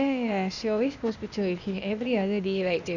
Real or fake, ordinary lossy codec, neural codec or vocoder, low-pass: fake; none; codec, 16 kHz, 0.8 kbps, ZipCodec; 7.2 kHz